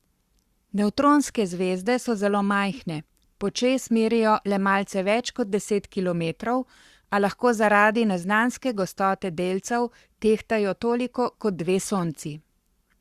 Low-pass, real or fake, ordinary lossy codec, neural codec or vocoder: 14.4 kHz; fake; Opus, 64 kbps; codec, 44.1 kHz, 7.8 kbps, Pupu-Codec